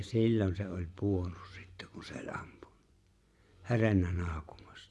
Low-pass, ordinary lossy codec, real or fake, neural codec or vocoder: none; none; fake; vocoder, 24 kHz, 100 mel bands, Vocos